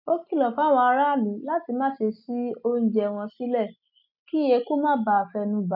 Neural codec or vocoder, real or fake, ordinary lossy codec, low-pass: none; real; none; 5.4 kHz